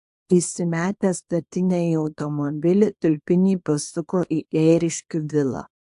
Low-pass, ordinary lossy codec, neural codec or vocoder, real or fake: 10.8 kHz; AAC, 64 kbps; codec, 24 kHz, 0.9 kbps, WavTokenizer, small release; fake